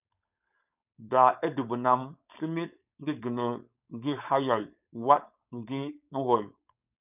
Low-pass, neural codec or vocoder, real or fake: 3.6 kHz; codec, 16 kHz, 4.8 kbps, FACodec; fake